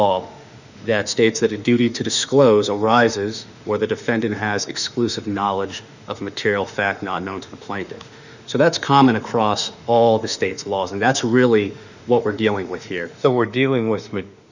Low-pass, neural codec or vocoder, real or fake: 7.2 kHz; autoencoder, 48 kHz, 32 numbers a frame, DAC-VAE, trained on Japanese speech; fake